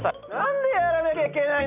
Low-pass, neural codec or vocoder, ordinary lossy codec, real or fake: 3.6 kHz; none; none; real